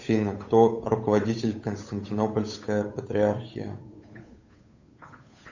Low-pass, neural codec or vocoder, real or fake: 7.2 kHz; codec, 16 kHz, 8 kbps, FunCodec, trained on Chinese and English, 25 frames a second; fake